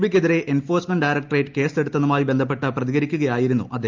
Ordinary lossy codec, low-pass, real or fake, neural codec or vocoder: Opus, 24 kbps; 7.2 kHz; real; none